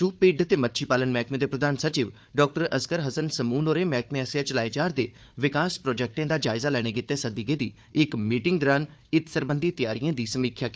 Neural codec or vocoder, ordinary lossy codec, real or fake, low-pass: codec, 16 kHz, 4 kbps, FunCodec, trained on Chinese and English, 50 frames a second; none; fake; none